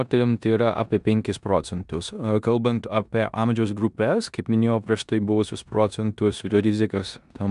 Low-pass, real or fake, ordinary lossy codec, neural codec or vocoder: 10.8 kHz; fake; MP3, 96 kbps; codec, 16 kHz in and 24 kHz out, 0.9 kbps, LongCat-Audio-Codec, four codebook decoder